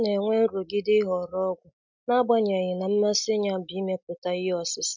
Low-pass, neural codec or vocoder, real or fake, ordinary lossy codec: 7.2 kHz; none; real; MP3, 64 kbps